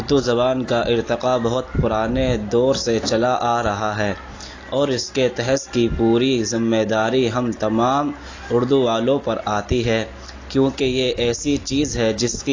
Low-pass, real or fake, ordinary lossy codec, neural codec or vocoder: 7.2 kHz; real; AAC, 32 kbps; none